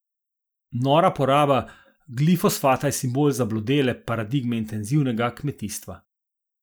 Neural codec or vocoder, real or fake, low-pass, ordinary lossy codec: none; real; none; none